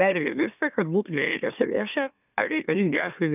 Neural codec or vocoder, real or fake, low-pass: autoencoder, 44.1 kHz, a latent of 192 numbers a frame, MeloTTS; fake; 3.6 kHz